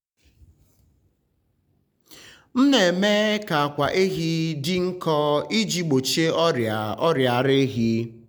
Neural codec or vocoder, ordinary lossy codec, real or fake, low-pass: none; none; real; none